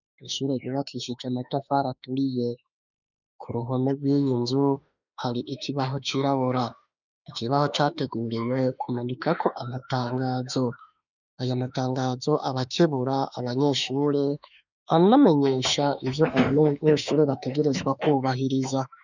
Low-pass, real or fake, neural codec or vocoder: 7.2 kHz; fake; autoencoder, 48 kHz, 32 numbers a frame, DAC-VAE, trained on Japanese speech